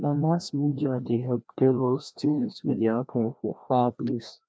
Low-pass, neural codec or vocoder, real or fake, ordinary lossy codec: none; codec, 16 kHz, 1 kbps, FreqCodec, larger model; fake; none